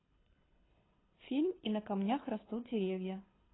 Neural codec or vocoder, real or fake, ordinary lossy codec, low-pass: codec, 24 kHz, 6 kbps, HILCodec; fake; AAC, 16 kbps; 7.2 kHz